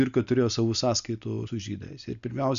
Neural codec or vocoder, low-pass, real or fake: none; 7.2 kHz; real